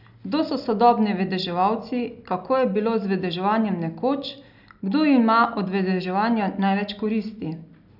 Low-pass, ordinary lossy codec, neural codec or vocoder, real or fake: 5.4 kHz; none; none; real